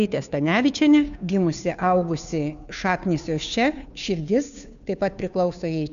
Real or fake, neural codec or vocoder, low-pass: fake; codec, 16 kHz, 2 kbps, FunCodec, trained on Chinese and English, 25 frames a second; 7.2 kHz